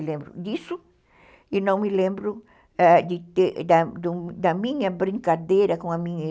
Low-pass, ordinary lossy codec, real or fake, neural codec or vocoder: none; none; real; none